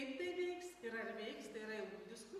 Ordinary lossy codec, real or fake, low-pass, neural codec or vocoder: MP3, 64 kbps; real; 14.4 kHz; none